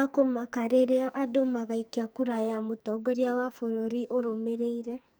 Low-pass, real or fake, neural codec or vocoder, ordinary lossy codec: none; fake; codec, 44.1 kHz, 2.6 kbps, SNAC; none